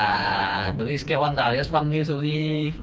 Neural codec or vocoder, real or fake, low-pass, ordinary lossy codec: codec, 16 kHz, 2 kbps, FreqCodec, smaller model; fake; none; none